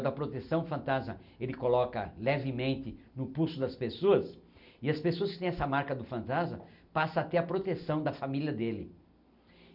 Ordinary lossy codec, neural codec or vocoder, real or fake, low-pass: none; none; real; 5.4 kHz